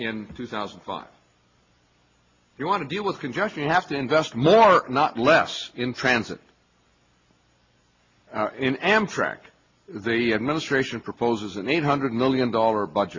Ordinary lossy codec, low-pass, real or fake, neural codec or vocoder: MP3, 32 kbps; 7.2 kHz; real; none